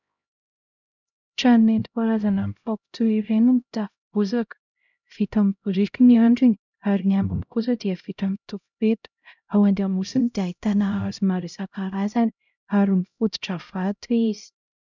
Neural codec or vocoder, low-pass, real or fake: codec, 16 kHz, 0.5 kbps, X-Codec, HuBERT features, trained on LibriSpeech; 7.2 kHz; fake